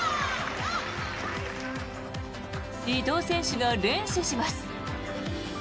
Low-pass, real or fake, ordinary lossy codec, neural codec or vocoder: none; real; none; none